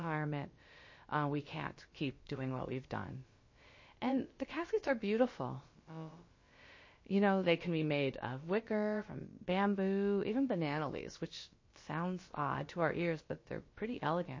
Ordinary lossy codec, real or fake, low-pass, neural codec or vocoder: MP3, 32 kbps; fake; 7.2 kHz; codec, 16 kHz, about 1 kbps, DyCAST, with the encoder's durations